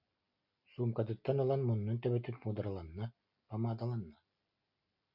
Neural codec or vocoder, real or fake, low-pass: none; real; 5.4 kHz